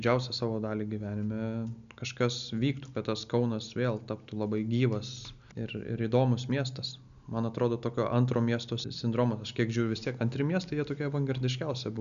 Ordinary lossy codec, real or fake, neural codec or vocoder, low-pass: MP3, 96 kbps; real; none; 7.2 kHz